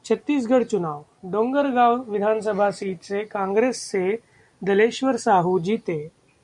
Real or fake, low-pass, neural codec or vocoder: real; 10.8 kHz; none